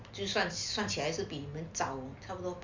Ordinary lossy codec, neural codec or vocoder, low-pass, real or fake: MP3, 64 kbps; none; 7.2 kHz; real